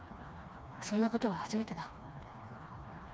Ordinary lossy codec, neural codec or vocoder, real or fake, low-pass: none; codec, 16 kHz, 2 kbps, FreqCodec, smaller model; fake; none